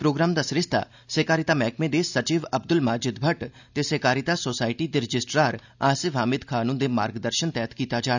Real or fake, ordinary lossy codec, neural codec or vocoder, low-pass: real; none; none; 7.2 kHz